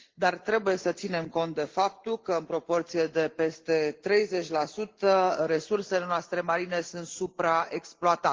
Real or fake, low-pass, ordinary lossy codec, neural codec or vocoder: real; 7.2 kHz; Opus, 16 kbps; none